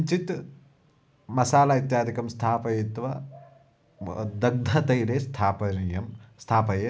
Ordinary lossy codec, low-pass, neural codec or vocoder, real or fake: none; none; none; real